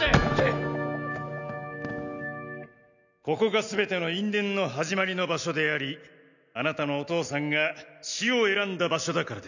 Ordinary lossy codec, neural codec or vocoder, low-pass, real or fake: none; none; 7.2 kHz; real